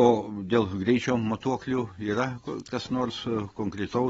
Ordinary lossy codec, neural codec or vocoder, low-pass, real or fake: AAC, 24 kbps; none; 19.8 kHz; real